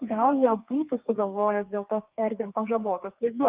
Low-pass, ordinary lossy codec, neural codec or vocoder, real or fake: 3.6 kHz; Opus, 24 kbps; codec, 32 kHz, 1.9 kbps, SNAC; fake